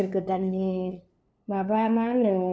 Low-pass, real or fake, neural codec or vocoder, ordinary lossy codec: none; fake; codec, 16 kHz, 2 kbps, FunCodec, trained on LibriTTS, 25 frames a second; none